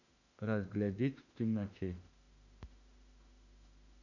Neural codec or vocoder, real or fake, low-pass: autoencoder, 48 kHz, 32 numbers a frame, DAC-VAE, trained on Japanese speech; fake; 7.2 kHz